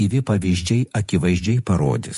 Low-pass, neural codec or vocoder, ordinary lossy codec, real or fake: 14.4 kHz; vocoder, 48 kHz, 128 mel bands, Vocos; MP3, 48 kbps; fake